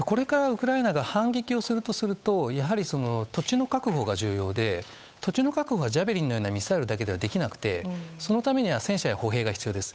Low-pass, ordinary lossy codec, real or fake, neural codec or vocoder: none; none; fake; codec, 16 kHz, 8 kbps, FunCodec, trained on Chinese and English, 25 frames a second